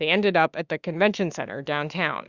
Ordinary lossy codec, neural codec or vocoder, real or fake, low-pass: Opus, 64 kbps; autoencoder, 48 kHz, 32 numbers a frame, DAC-VAE, trained on Japanese speech; fake; 7.2 kHz